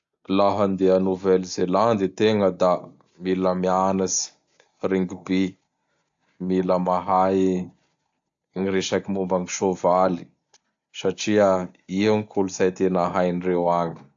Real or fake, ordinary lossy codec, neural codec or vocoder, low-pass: real; AAC, 64 kbps; none; 7.2 kHz